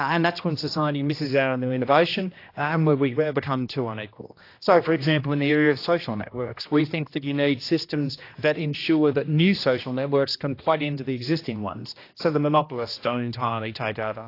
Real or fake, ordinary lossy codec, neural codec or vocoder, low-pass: fake; AAC, 32 kbps; codec, 16 kHz, 1 kbps, X-Codec, HuBERT features, trained on general audio; 5.4 kHz